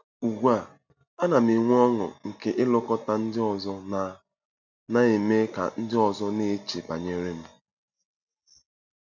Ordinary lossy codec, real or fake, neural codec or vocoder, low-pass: none; real; none; 7.2 kHz